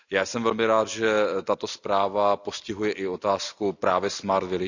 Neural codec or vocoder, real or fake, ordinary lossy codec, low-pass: none; real; none; 7.2 kHz